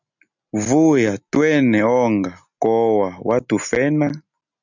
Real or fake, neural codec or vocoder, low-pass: real; none; 7.2 kHz